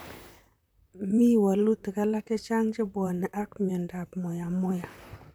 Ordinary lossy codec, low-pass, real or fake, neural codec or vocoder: none; none; fake; vocoder, 44.1 kHz, 128 mel bands, Pupu-Vocoder